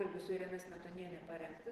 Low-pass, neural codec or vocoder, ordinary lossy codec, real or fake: 14.4 kHz; vocoder, 44.1 kHz, 128 mel bands every 512 samples, BigVGAN v2; Opus, 16 kbps; fake